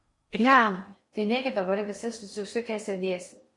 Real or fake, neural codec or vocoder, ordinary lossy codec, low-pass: fake; codec, 16 kHz in and 24 kHz out, 0.6 kbps, FocalCodec, streaming, 4096 codes; MP3, 48 kbps; 10.8 kHz